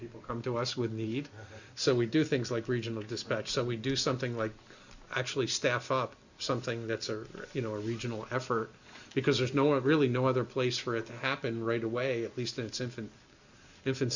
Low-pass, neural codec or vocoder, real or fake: 7.2 kHz; none; real